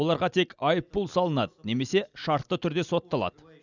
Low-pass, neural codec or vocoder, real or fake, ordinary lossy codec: 7.2 kHz; none; real; none